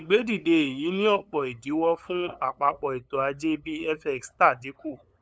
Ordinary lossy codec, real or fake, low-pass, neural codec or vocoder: none; fake; none; codec, 16 kHz, 8 kbps, FunCodec, trained on LibriTTS, 25 frames a second